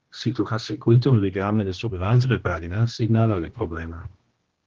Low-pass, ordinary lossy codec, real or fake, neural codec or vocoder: 7.2 kHz; Opus, 16 kbps; fake; codec, 16 kHz, 1 kbps, X-Codec, HuBERT features, trained on general audio